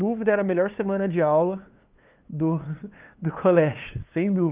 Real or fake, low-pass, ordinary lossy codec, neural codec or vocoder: fake; 3.6 kHz; Opus, 32 kbps; codec, 16 kHz, 2 kbps, FunCodec, trained on LibriTTS, 25 frames a second